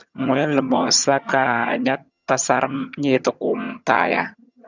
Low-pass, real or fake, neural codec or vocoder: 7.2 kHz; fake; vocoder, 22.05 kHz, 80 mel bands, HiFi-GAN